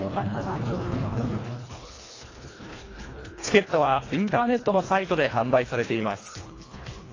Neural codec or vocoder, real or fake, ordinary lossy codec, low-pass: codec, 24 kHz, 1.5 kbps, HILCodec; fake; AAC, 32 kbps; 7.2 kHz